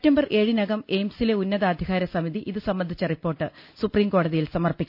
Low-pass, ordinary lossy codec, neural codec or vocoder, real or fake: 5.4 kHz; none; none; real